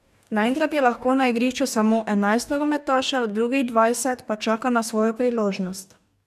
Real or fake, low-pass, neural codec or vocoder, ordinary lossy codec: fake; 14.4 kHz; codec, 44.1 kHz, 2.6 kbps, DAC; none